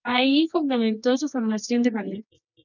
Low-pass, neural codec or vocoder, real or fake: 7.2 kHz; codec, 24 kHz, 0.9 kbps, WavTokenizer, medium music audio release; fake